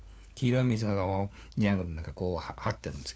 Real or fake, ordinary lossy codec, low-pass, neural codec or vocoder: fake; none; none; codec, 16 kHz, 4 kbps, FunCodec, trained on LibriTTS, 50 frames a second